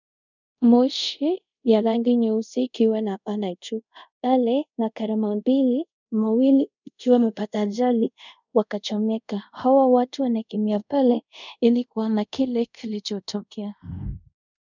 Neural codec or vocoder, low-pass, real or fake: codec, 24 kHz, 0.5 kbps, DualCodec; 7.2 kHz; fake